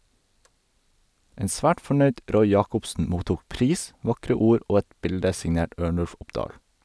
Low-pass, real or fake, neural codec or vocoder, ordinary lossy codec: none; real; none; none